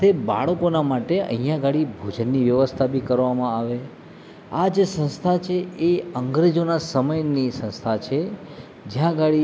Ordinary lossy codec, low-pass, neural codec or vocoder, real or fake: none; none; none; real